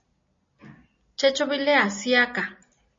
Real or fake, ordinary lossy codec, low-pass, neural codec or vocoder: real; MP3, 32 kbps; 7.2 kHz; none